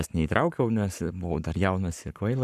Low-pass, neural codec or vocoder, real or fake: 14.4 kHz; codec, 44.1 kHz, 7.8 kbps, Pupu-Codec; fake